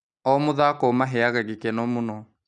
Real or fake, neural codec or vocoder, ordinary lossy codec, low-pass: real; none; none; 9.9 kHz